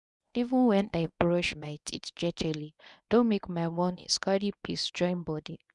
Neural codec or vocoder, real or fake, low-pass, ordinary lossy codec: codec, 24 kHz, 0.9 kbps, WavTokenizer, medium speech release version 1; fake; 10.8 kHz; none